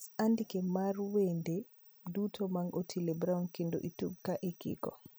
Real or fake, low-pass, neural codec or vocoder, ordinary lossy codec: real; none; none; none